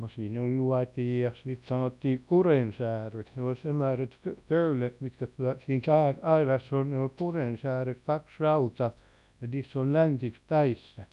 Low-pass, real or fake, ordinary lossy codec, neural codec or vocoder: 10.8 kHz; fake; none; codec, 24 kHz, 0.9 kbps, WavTokenizer, large speech release